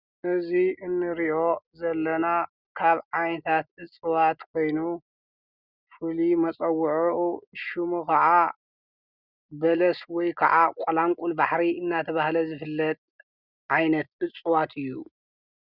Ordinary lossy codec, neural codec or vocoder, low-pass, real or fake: Opus, 64 kbps; none; 5.4 kHz; real